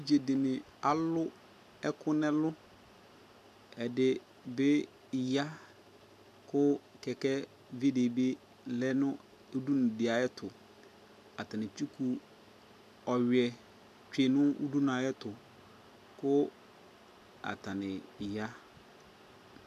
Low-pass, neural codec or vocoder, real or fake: 14.4 kHz; none; real